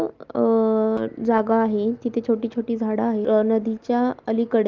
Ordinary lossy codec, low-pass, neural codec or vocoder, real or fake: Opus, 24 kbps; 7.2 kHz; none; real